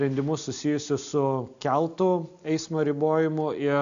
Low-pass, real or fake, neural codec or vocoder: 7.2 kHz; real; none